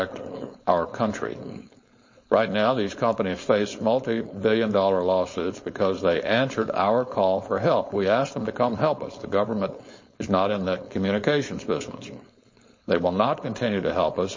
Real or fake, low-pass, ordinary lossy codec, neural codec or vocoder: fake; 7.2 kHz; MP3, 32 kbps; codec, 16 kHz, 4.8 kbps, FACodec